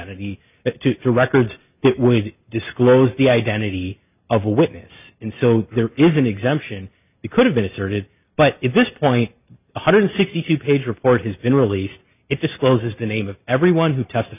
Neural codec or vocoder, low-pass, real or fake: none; 3.6 kHz; real